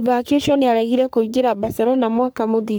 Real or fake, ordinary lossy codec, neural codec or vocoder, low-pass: fake; none; codec, 44.1 kHz, 3.4 kbps, Pupu-Codec; none